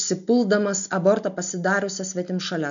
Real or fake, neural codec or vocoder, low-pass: real; none; 7.2 kHz